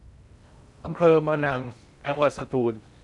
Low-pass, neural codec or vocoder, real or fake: 10.8 kHz; codec, 16 kHz in and 24 kHz out, 0.6 kbps, FocalCodec, streaming, 2048 codes; fake